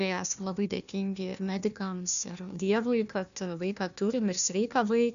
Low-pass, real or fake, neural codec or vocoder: 7.2 kHz; fake; codec, 16 kHz, 1 kbps, FunCodec, trained on Chinese and English, 50 frames a second